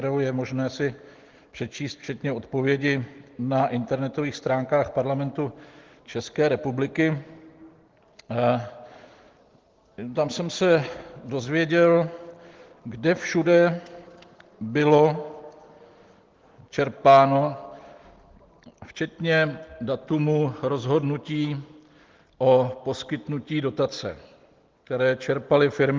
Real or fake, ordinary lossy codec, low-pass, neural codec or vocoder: real; Opus, 16 kbps; 7.2 kHz; none